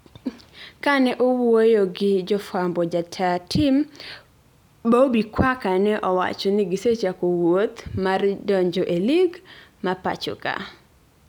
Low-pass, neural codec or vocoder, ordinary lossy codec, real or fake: 19.8 kHz; none; none; real